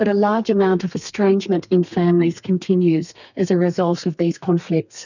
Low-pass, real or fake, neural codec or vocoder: 7.2 kHz; fake; codec, 32 kHz, 1.9 kbps, SNAC